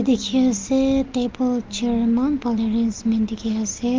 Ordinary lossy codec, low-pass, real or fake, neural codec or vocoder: Opus, 32 kbps; 7.2 kHz; real; none